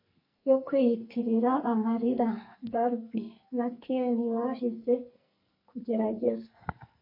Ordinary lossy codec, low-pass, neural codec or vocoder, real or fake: MP3, 32 kbps; 5.4 kHz; codec, 44.1 kHz, 2.6 kbps, SNAC; fake